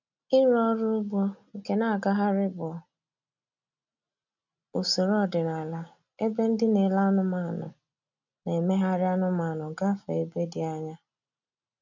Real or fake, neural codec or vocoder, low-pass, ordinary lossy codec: real; none; 7.2 kHz; none